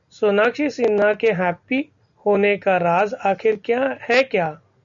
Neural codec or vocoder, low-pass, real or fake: none; 7.2 kHz; real